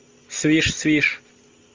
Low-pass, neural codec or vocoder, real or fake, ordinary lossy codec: 7.2 kHz; none; real; Opus, 32 kbps